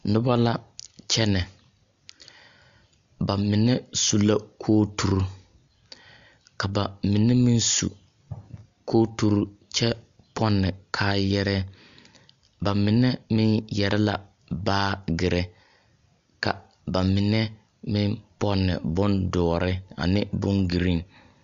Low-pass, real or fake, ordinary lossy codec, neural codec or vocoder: 7.2 kHz; real; MP3, 64 kbps; none